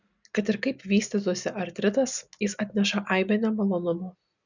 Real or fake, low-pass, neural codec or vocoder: real; 7.2 kHz; none